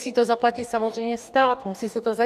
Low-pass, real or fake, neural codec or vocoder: 14.4 kHz; fake; codec, 44.1 kHz, 2.6 kbps, DAC